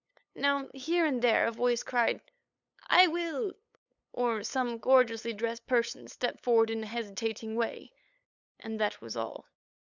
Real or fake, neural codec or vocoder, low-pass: fake; codec, 16 kHz, 8 kbps, FunCodec, trained on LibriTTS, 25 frames a second; 7.2 kHz